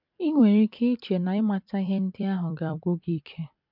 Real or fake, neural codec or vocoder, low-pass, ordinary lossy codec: fake; vocoder, 44.1 kHz, 128 mel bands every 256 samples, BigVGAN v2; 5.4 kHz; MP3, 48 kbps